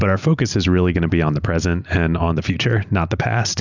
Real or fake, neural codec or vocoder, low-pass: real; none; 7.2 kHz